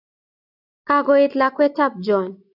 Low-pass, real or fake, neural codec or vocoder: 5.4 kHz; real; none